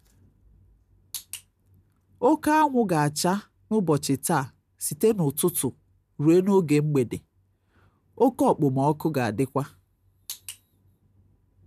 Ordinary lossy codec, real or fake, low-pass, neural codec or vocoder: none; real; 14.4 kHz; none